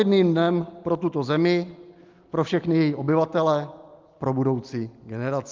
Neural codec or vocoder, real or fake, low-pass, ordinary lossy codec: none; real; 7.2 kHz; Opus, 32 kbps